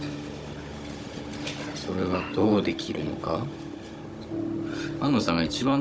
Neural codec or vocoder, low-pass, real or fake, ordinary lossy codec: codec, 16 kHz, 16 kbps, FunCodec, trained on Chinese and English, 50 frames a second; none; fake; none